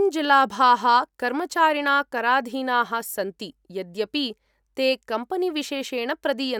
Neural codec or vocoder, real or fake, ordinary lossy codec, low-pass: none; real; none; 19.8 kHz